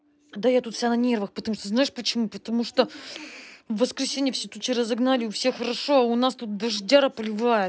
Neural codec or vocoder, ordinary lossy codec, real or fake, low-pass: none; none; real; none